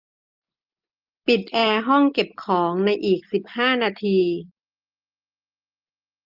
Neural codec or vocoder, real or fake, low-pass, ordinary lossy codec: none; real; 5.4 kHz; Opus, 24 kbps